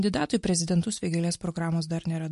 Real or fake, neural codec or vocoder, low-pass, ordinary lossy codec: real; none; 14.4 kHz; MP3, 48 kbps